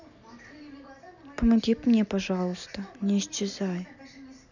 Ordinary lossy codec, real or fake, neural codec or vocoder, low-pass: none; real; none; 7.2 kHz